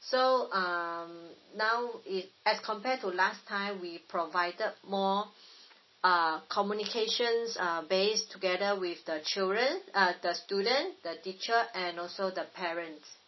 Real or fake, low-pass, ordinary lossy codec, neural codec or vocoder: real; 7.2 kHz; MP3, 24 kbps; none